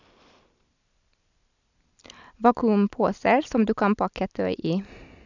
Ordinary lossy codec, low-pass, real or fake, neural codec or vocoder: none; 7.2 kHz; real; none